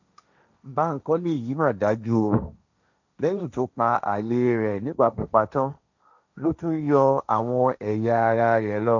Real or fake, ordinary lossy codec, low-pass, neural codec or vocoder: fake; none; 7.2 kHz; codec, 16 kHz, 1.1 kbps, Voila-Tokenizer